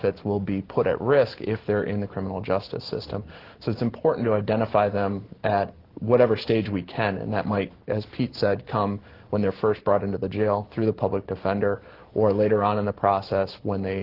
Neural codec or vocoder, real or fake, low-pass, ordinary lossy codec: none; real; 5.4 kHz; Opus, 16 kbps